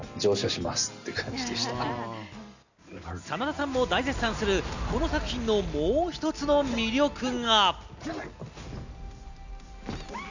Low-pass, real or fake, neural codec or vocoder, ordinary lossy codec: 7.2 kHz; real; none; none